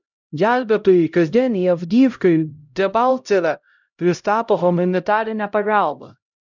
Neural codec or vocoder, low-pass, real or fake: codec, 16 kHz, 0.5 kbps, X-Codec, HuBERT features, trained on LibriSpeech; 7.2 kHz; fake